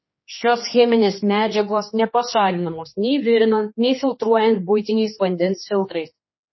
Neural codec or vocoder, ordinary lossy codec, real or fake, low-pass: codec, 16 kHz, 2 kbps, X-Codec, HuBERT features, trained on general audio; MP3, 24 kbps; fake; 7.2 kHz